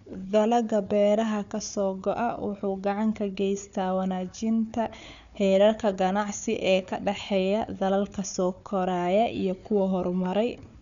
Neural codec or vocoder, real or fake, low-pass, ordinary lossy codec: codec, 16 kHz, 4 kbps, FunCodec, trained on Chinese and English, 50 frames a second; fake; 7.2 kHz; none